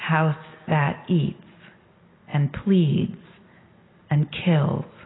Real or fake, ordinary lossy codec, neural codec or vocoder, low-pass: real; AAC, 16 kbps; none; 7.2 kHz